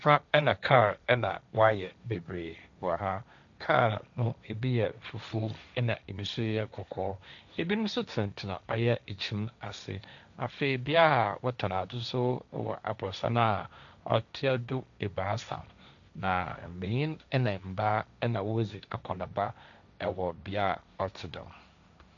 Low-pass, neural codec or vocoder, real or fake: 7.2 kHz; codec, 16 kHz, 1.1 kbps, Voila-Tokenizer; fake